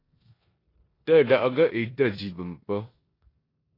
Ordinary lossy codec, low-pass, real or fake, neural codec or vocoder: AAC, 24 kbps; 5.4 kHz; fake; codec, 16 kHz in and 24 kHz out, 0.9 kbps, LongCat-Audio-Codec, four codebook decoder